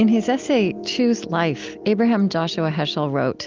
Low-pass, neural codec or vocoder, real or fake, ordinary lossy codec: 7.2 kHz; none; real; Opus, 24 kbps